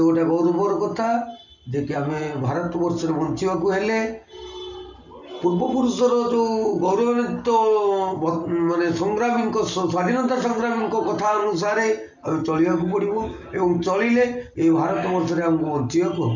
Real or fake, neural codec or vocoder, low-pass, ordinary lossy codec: real; none; 7.2 kHz; none